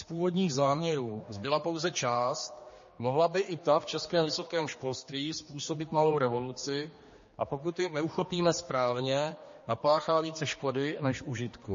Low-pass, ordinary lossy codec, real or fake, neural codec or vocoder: 7.2 kHz; MP3, 32 kbps; fake; codec, 16 kHz, 2 kbps, X-Codec, HuBERT features, trained on general audio